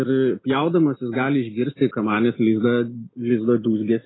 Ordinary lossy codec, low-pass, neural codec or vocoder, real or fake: AAC, 16 kbps; 7.2 kHz; none; real